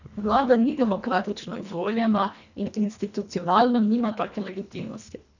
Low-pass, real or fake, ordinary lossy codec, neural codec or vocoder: 7.2 kHz; fake; none; codec, 24 kHz, 1.5 kbps, HILCodec